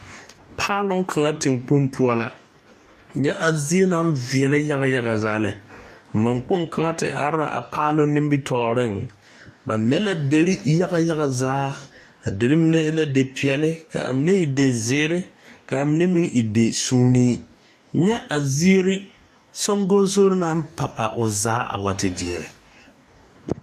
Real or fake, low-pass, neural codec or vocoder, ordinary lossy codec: fake; 14.4 kHz; codec, 44.1 kHz, 2.6 kbps, DAC; AAC, 96 kbps